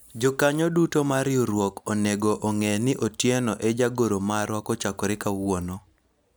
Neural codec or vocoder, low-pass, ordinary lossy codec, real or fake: none; none; none; real